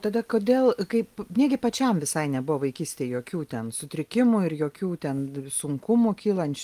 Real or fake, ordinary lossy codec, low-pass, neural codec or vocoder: real; Opus, 32 kbps; 14.4 kHz; none